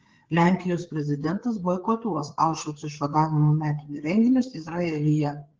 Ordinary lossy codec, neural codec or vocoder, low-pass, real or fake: Opus, 16 kbps; codec, 16 kHz, 4 kbps, FreqCodec, larger model; 7.2 kHz; fake